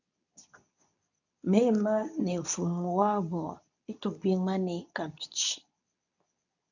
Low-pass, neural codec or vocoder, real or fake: 7.2 kHz; codec, 24 kHz, 0.9 kbps, WavTokenizer, medium speech release version 2; fake